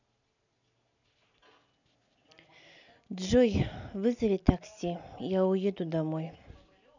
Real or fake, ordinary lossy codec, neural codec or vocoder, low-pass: real; none; none; 7.2 kHz